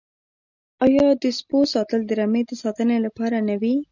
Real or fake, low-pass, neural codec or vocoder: real; 7.2 kHz; none